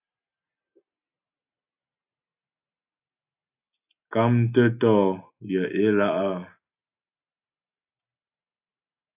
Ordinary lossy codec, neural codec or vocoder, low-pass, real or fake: AAC, 32 kbps; none; 3.6 kHz; real